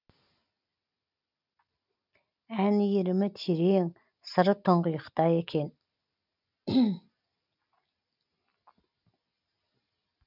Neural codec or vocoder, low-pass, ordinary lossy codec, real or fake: none; 5.4 kHz; none; real